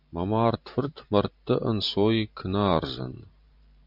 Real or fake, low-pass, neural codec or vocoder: fake; 5.4 kHz; vocoder, 44.1 kHz, 128 mel bands every 512 samples, BigVGAN v2